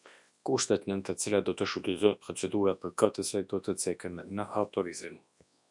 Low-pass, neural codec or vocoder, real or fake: 10.8 kHz; codec, 24 kHz, 0.9 kbps, WavTokenizer, large speech release; fake